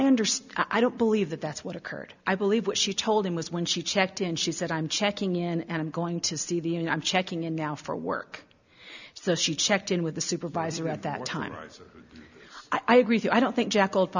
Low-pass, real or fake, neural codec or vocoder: 7.2 kHz; real; none